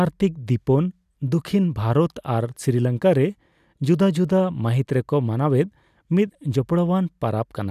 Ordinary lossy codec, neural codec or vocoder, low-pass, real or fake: none; none; 14.4 kHz; real